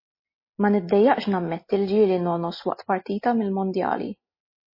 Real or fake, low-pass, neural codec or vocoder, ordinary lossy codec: real; 5.4 kHz; none; MP3, 24 kbps